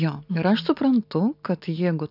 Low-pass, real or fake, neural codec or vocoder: 5.4 kHz; real; none